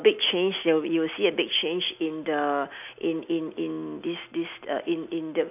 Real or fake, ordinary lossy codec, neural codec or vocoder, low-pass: real; none; none; 3.6 kHz